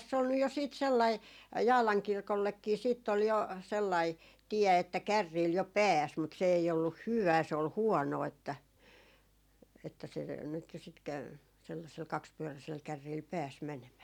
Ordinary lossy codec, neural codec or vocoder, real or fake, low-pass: none; none; real; 19.8 kHz